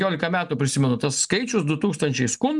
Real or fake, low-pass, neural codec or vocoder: real; 10.8 kHz; none